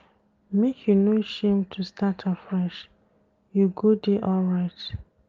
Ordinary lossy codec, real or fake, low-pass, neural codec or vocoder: Opus, 32 kbps; real; 7.2 kHz; none